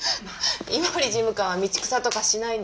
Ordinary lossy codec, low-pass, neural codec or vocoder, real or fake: none; none; none; real